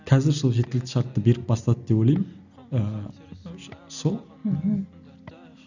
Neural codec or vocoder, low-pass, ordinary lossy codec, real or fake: none; 7.2 kHz; none; real